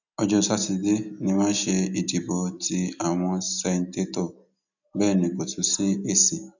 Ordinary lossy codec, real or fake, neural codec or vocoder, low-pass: none; real; none; 7.2 kHz